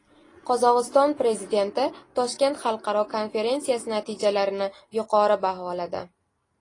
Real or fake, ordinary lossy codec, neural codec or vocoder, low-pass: real; AAC, 32 kbps; none; 10.8 kHz